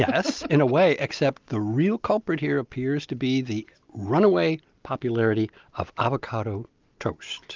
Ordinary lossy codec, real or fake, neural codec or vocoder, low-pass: Opus, 32 kbps; real; none; 7.2 kHz